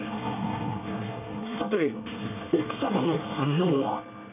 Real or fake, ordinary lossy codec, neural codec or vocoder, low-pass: fake; none; codec, 24 kHz, 1 kbps, SNAC; 3.6 kHz